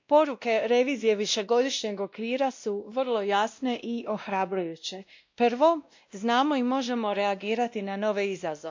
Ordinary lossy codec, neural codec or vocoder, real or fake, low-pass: MP3, 64 kbps; codec, 16 kHz, 1 kbps, X-Codec, WavLM features, trained on Multilingual LibriSpeech; fake; 7.2 kHz